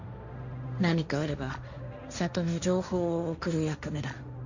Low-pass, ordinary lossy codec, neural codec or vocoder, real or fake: 7.2 kHz; none; codec, 16 kHz, 1.1 kbps, Voila-Tokenizer; fake